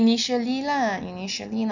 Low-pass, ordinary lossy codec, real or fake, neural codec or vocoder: 7.2 kHz; none; real; none